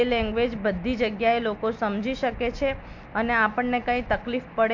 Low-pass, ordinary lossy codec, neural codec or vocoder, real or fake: 7.2 kHz; MP3, 64 kbps; none; real